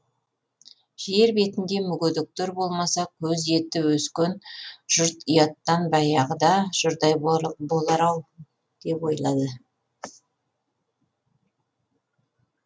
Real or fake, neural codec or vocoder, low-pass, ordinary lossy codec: real; none; none; none